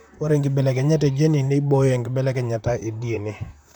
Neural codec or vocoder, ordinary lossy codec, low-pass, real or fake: vocoder, 44.1 kHz, 128 mel bands, Pupu-Vocoder; none; 19.8 kHz; fake